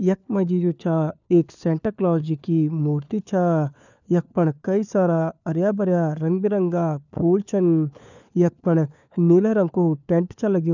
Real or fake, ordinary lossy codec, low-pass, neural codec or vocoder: fake; none; 7.2 kHz; codec, 16 kHz, 4 kbps, FunCodec, trained on LibriTTS, 50 frames a second